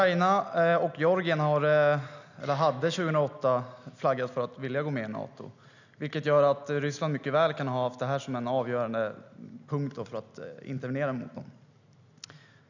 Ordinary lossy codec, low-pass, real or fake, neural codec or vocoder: none; 7.2 kHz; real; none